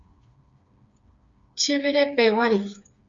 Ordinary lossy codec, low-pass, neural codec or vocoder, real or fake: Opus, 64 kbps; 7.2 kHz; codec, 16 kHz, 4 kbps, FreqCodec, smaller model; fake